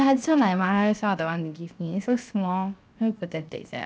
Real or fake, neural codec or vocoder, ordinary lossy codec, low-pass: fake; codec, 16 kHz, 0.7 kbps, FocalCodec; none; none